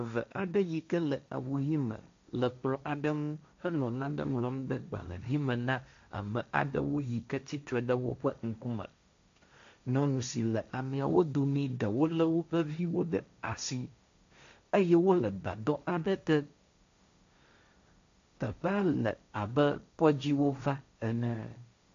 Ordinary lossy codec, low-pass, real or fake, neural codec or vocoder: AAC, 64 kbps; 7.2 kHz; fake; codec, 16 kHz, 1.1 kbps, Voila-Tokenizer